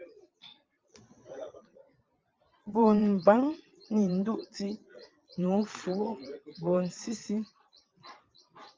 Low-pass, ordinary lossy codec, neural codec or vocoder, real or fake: 7.2 kHz; Opus, 24 kbps; vocoder, 22.05 kHz, 80 mel bands, Vocos; fake